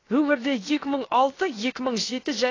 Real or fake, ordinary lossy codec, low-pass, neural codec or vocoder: fake; AAC, 32 kbps; 7.2 kHz; codec, 16 kHz, about 1 kbps, DyCAST, with the encoder's durations